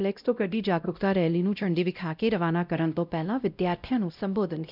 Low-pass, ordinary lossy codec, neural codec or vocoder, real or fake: 5.4 kHz; none; codec, 16 kHz, 0.5 kbps, X-Codec, WavLM features, trained on Multilingual LibriSpeech; fake